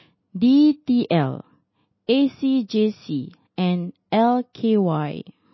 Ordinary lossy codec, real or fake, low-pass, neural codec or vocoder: MP3, 24 kbps; real; 7.2 kHz; none